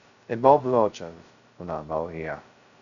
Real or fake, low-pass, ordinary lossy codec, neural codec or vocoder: fake; 7.2 kHz; none; codec, 16 kHz, 0.2 kbps, FocalCodec